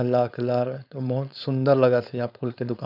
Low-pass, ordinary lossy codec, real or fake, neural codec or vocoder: 5.4 kHz; none; fake; codec, 16 kHz, 4.8 kbps, FACodec